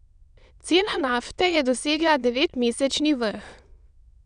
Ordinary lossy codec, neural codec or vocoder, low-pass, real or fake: none; autoencoder, 22.05 kHz, a latent of 192 numbers a frame, VITS, trained on many speakers; 9.9 kHz; fake